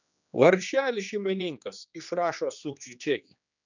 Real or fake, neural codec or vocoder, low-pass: fake; codec, 16 kHz, 2 kbps, X-Codec, HuBERT features, trained on general audio; 7.2 kHz